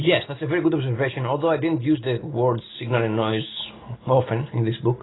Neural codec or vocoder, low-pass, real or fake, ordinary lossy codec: none; 7.2 kHz; real; AAC, 16 kbps